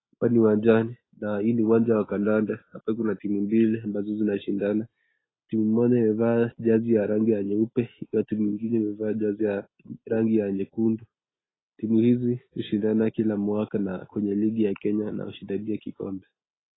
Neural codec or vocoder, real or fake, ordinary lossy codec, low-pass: none; real; AAC, 16 kbps; 7.2 kHz